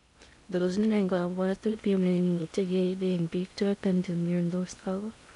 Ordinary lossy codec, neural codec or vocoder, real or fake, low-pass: none; codec, 16 kHz in and 24 kHz out, 0.6 kbps, FocalCodec, streaming, 2048 codes; fake; 10.8 kHz